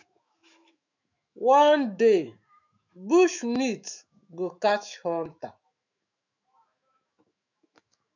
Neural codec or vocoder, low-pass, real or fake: autoencoder, 48 kHz, 128 numbers a frame, DAC-VAE, trained on Japanese speech; 7.2 kHz; fake